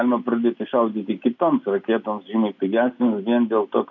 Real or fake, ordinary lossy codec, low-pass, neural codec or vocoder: real; MP3, 64 kbps; 7.2 kHz; none